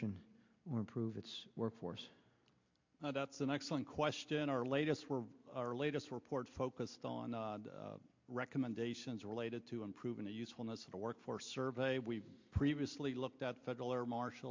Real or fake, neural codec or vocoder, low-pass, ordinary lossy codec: real; none; 7.2 kHz; MP3, 48 kbps